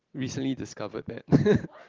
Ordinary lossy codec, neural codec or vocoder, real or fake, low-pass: Opus, 16 kbps; none; real; 7.2 kHz